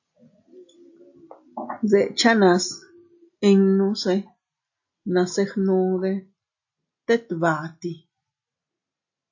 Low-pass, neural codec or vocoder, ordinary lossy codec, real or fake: 7.2 kHz; none; AAC, 48 kbps; real